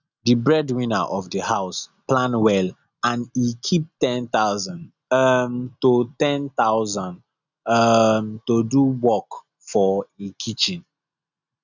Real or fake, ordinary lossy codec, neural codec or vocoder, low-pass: real; none; none; 7.2 kHz